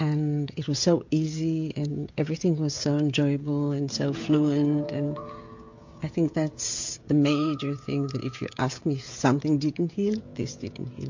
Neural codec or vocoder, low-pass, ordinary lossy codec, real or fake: codec, 16 kHz, 16 kbps, FreqCodec, smaller model; 7.2 kHz; MP3, 48 kbps; fake